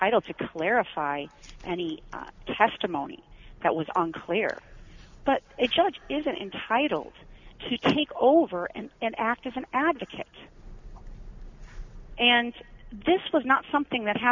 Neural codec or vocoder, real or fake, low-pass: none; real; 7.2 kHz